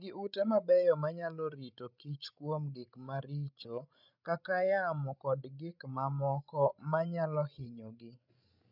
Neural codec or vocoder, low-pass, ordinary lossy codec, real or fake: codec, 16 kHz, 16 kbps, FreqCodec, larger model; 5.4 kHz; none; fake